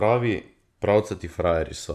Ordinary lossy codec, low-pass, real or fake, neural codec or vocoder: none; 14.4 kHz; fake; vocoder, 44.1 kHz, 128 mel bands every 512 samples, BigVGAN v2